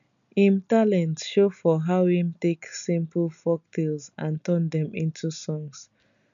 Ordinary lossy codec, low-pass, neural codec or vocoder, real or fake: none; 7.2 kHz; none; real